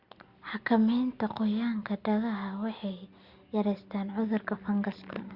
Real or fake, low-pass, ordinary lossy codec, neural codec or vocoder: real; 5.4 kHz; Opus, 64 kbps; none